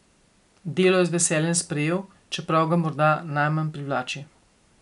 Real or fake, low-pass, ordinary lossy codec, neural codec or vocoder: real; 10.8 kHz; none; none